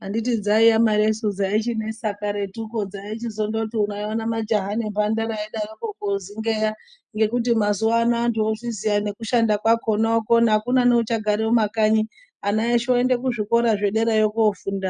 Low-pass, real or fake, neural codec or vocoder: 9.9 kHz; real; none